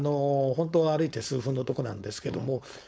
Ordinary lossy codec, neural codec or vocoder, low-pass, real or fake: none; codec, 16 kHz, 4.8 kbps, FACodec; none; fake